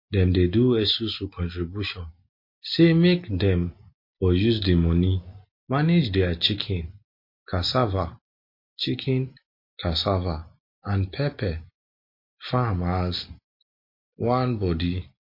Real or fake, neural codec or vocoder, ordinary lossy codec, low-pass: real; none; MP3, 32 kbps; 5.4 kHz